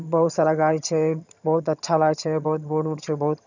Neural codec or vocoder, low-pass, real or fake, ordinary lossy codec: vocoder, 22.05 kHz, 80 mel bands, HiFi-GAN; 7.2 kHz; fake; none